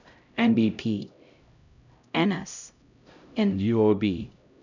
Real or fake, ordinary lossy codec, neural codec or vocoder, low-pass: fake; none; codec, 16 kHz, 0.5 kbps, X-Codec, HuBERT features, trained on LibriSpeech; 7.2 kHz